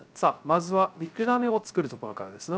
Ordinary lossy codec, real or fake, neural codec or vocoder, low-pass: none; fake; codec, 16 kHz, 0.3 kbps, FocalCodec; none